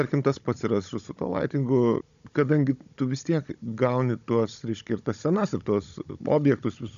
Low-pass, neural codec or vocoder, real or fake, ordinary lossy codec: 7.2 kHz; codec, 16 kHz, 16 kbps, FunCodec, trained on Chinese and English, 50 frames a second; fake; MP3, 96 kbps